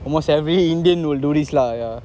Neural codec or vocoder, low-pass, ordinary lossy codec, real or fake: none; none; none; real